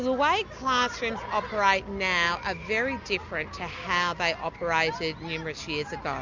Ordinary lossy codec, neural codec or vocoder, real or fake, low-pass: MP3, 64 kbps; none; real; 7.2 kHz